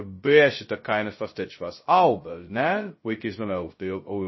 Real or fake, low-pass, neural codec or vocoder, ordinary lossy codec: fake; 7.2 kHz; codec, 16 kHz, 0.2 kbps, FocalCodec; MP3, 24 kbps